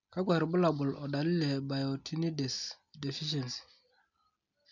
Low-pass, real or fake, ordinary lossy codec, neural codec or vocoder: 7.2 kHz; real; none; none